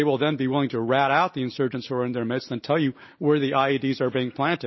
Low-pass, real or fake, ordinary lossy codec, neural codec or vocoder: 7.2 kHz; real; MP3, 24 kbps; none